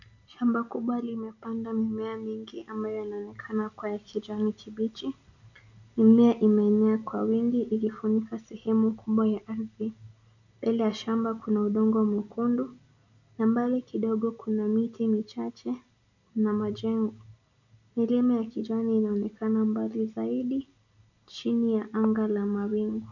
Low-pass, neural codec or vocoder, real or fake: 7.2 kHz; none; real